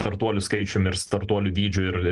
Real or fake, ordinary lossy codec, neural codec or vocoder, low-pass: real; Opus, 24 kbps; none; 10.8 kHz